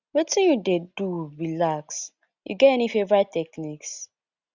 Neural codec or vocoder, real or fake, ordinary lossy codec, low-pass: none; real; Opus, 64 kbps; 7.2 kHz